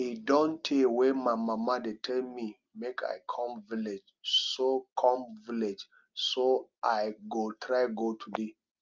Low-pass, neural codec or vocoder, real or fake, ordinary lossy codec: 7.2 kHz; none; real; Opus, 24 kbps